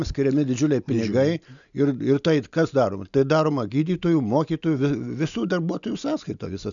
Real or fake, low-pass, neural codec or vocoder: real; 7.2 kHz; none